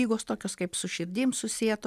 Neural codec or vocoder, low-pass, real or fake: none; 14.4 kHz; real